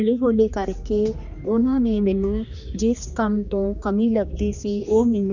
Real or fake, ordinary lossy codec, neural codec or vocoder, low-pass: fake; none; codec, 16 kHz, 2 kbps, X-Codec, HuBERT features, trained on general audio; 7.2 kHz